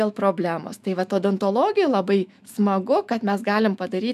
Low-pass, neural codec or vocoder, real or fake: 14.4 kHz; autoencoder, 48 kHz, 128 numbers a frame, DAC-VAE, trained on Japanese speech; fake